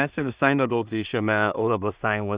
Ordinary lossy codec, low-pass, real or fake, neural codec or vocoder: Opus, 64 kbps; 3.6 kHz; fake; codec, 16 kHz in and 24 kHz out, 0.4 kbps, LongCat-Audio-Codec, two codebook decoder